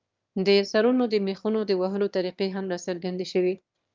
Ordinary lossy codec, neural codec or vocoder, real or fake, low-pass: Opus, 24 kbps; autoencoder, 22.05 kHz, a latent of 192 numbers a frame, VITS, trained on one speaker; fake; 7.2 kHz